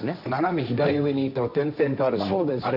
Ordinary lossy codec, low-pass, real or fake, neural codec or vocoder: none; 5.4 kHz; fake; codec, 16 kHz, 1.1 kbps, Voila-Tokenizer